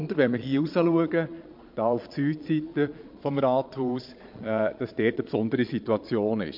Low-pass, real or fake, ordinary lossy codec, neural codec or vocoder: 5.4 kHz; fake; MP3, 48 kbps; vocoder, 22.05 kHz, 80 mel bands, Vocos